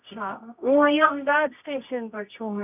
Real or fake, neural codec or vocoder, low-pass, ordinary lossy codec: fake; codec, 24 kHz, 0.9 kbps, WavTokenizer, medium music audio release; 3.6 kHz; none